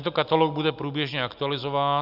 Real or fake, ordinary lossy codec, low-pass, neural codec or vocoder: real; Opus, 64 kbps; 5.4 kHz; none